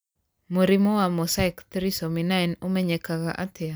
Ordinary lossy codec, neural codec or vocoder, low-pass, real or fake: none; none; none; real